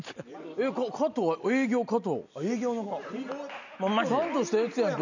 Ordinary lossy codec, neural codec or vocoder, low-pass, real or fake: none; none; 7.2 kHz; real